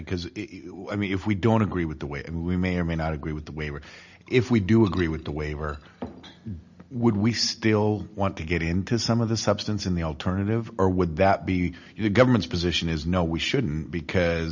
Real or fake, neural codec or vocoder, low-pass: real; none; 7.2 kHz